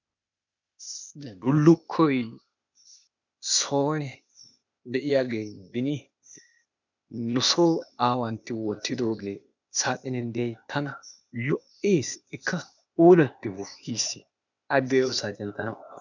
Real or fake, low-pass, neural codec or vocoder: fake; 7.2 kHz; codec, 16 kHz, 0.8 kbps, ZipCodec